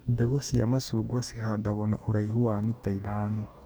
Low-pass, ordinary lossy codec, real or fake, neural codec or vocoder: none; none; fake; codec, 44.1 kHz, 2.6 kbps, DAC